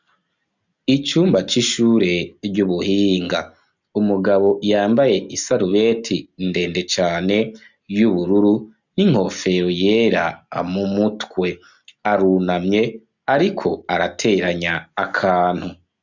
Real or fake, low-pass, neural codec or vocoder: real; 7.2 kHz; none